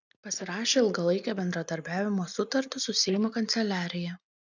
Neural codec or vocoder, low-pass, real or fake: none; 7.2 kHz; real